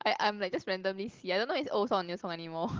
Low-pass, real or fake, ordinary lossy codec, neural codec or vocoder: 7.2 kHz; real; Opus, 16 kbps; none